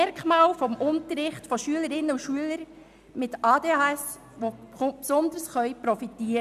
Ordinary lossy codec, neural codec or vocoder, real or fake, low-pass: none; none; real; 14.4 kHz